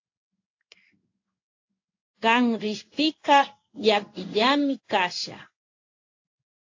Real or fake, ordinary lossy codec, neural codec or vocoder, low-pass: fake; AAC, 48 kbps; codec, 16 kHz in and 24 kHz out, 1 kbps, XY-Tokenizer; 7.2 kHz